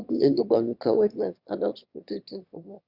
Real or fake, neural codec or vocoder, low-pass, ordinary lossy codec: fake; autoencoder, 22.05 kHz, a latent of 192 numbers a frame, VITS, trained on one speaker; 5.4 kHz; Opus, 64 kbps